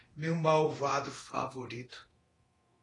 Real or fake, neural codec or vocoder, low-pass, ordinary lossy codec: fake; codec, 24 kHz, 0.9 kbps, DualCodec; 10.8 kHz; AAC, 32 kbps